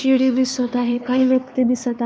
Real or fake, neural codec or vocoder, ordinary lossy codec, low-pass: fake; codec, 16 kHz, 4 kbps, X-Codec, HuBERT features, trained on LibriSpeech; none; none